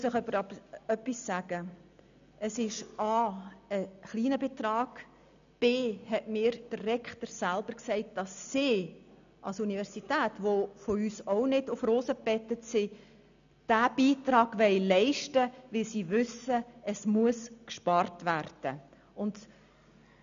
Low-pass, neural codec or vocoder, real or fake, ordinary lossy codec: 7.2 kHz; none; real; none